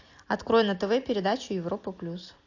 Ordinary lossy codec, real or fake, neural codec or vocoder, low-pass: AAC, 48 kbps; real; none; 7.2 kHz